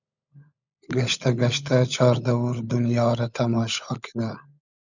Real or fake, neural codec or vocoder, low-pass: fake; codec, 16 kHz, 16 kbps, FunCodec, trained on LibriTTS, 50 frames a second; 7.2 kHz